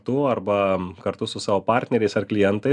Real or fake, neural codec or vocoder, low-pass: real; none; 10.8 kHz